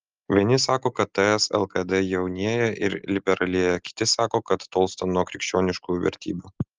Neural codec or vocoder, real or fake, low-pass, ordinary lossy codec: none; real; 7.2 kHz; Opus, 24 kbps